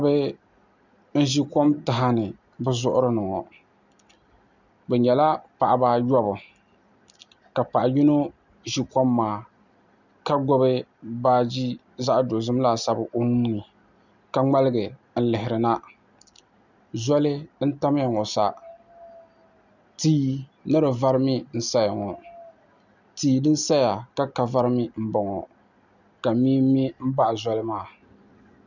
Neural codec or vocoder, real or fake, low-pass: none; real; 7.2 kHz